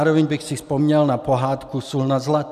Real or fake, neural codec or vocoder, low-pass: real; none; 14.4 kHz